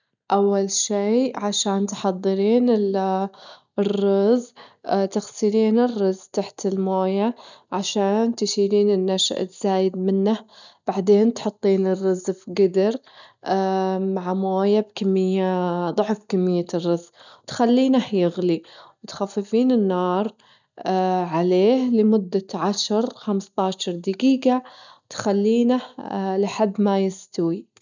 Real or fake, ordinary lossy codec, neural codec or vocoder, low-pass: real; none; none; 7.2 kHz